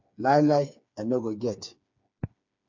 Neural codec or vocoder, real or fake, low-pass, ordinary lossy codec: codec, 16 kHz, 4 kbps, FreqCodec, smaller model; fake; 7.2 kHz; MP3, 64 kbps